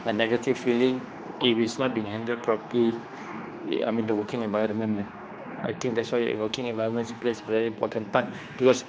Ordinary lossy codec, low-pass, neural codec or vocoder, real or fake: none; none; codec, 16 kHz, 2 kbps, X-Codec, HuBERT features, trained on general audio; fake